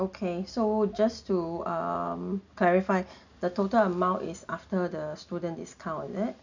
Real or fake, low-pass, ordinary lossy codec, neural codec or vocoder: real; 7.2 kHz; none; none